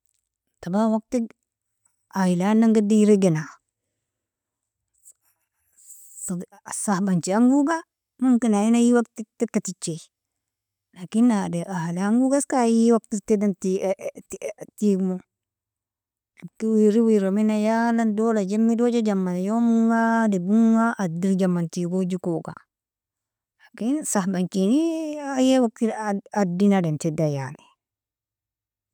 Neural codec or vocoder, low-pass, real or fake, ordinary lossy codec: none; 19.8 kHz; real; none